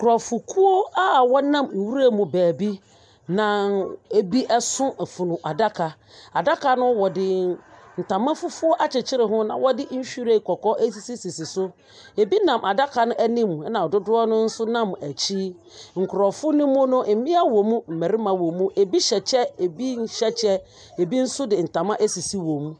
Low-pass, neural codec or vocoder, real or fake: 9.9 kHz; none; real